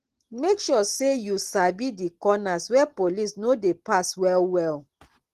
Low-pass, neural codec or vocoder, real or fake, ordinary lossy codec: 14.4 kHz; none; real; Opus, 16 kbps